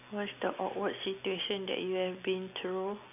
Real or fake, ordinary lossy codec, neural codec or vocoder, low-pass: real; none; none; 3.6 kHz